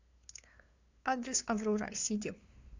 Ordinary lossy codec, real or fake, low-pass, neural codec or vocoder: AAC, 48 kbps; fake; 7.2 kHz; codec, 16 kHz, 2 kbps, FunCodec, trained on LibriTTS, 25 frames a second